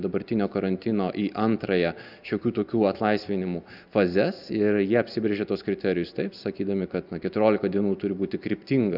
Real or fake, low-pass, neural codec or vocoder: real; 5.4 kHz; none